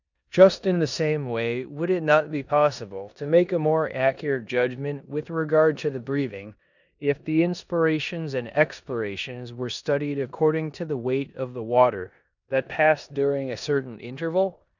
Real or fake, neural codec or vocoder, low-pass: fake; codec, 16 kHz in and 24 kHz out, 0.9 kbps, LongCat-Audio-Codec, four codebook decoder; 7.2 kHz